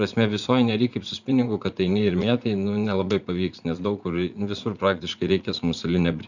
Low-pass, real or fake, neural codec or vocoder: 7.2 kHz; fake; vocoder, 44.1 kHz, 80 mel bands, Vocos